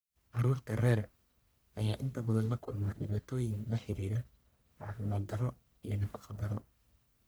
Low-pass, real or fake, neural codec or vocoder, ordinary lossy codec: none; fake; codec, 44.1 kHz, 1.7 kbps, Pupu-Codec; none